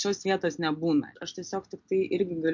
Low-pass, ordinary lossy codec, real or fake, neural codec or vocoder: 7.2 kHz; MP3, 48 kbps; real; none